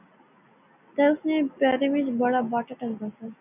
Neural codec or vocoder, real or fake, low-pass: none; real; 3.6 kHz